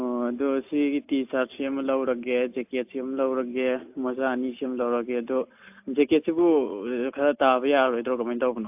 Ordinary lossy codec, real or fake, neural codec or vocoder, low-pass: AAC, 32 kbps; real; none; 3.6 kHz